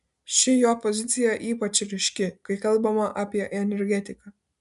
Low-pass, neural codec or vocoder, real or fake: 10.8 kHz; none; real